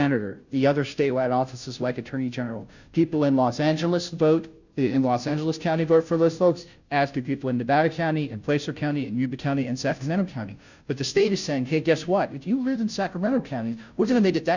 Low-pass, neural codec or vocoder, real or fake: 7.2 kHz; codec, 16 kHz, 0.5 kbps, FunCodec, trained on Chinese and English, 25 frames a second; fake